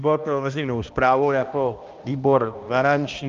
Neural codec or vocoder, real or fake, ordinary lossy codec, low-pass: codec, 16 kHz, 1 kbps, X-Codec, HuBERT features, trained on balanced general audio; fake; Opus, 24 kbps; 7.2 kHz